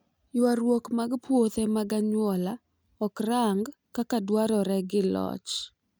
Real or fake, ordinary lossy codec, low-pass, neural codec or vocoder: real; none; none; none